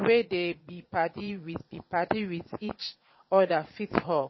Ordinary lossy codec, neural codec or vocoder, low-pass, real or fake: MP3, 24 kbps; vocoder, 22.05 kHz, 80 mel bands, Vocos; 7.2 kHz; fake